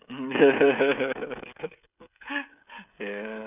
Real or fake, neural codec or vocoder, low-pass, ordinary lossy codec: fake; codec, 16 kHz, 16 kbps, FreqCodec, smaller model; 3.6 kHz; none